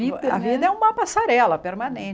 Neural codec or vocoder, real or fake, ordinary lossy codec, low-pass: none; real; none; none